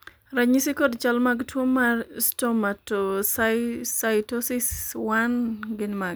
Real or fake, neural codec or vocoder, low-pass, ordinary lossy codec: real; none; none; none